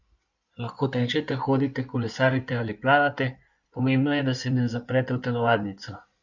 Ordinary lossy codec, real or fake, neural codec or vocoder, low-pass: none; fake; codec, 16 kHz in and 24 kHz out, 2.2 kbps, FireRedTTS-2 codec; 7.2 kHz